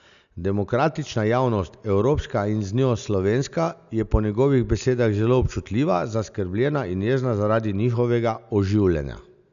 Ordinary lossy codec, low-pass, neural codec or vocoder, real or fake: none; 7.2 kHz; none; real